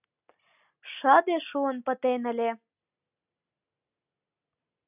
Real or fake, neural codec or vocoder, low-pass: real; none; 3.6 kHz